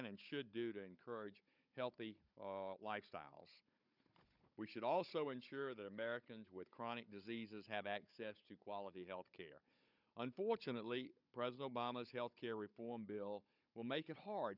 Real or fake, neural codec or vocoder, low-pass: fake; codec, 16 kHz, 16 kbps, FunCodec, trained on Chinese and English, 50 frames a second; 5.4 kHz